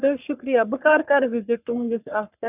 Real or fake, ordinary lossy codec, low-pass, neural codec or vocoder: fake; AAC, 32 kbps; 3.6 kHz; codec, 44.1 kHz, 3.4 kbps, Pupu-Codec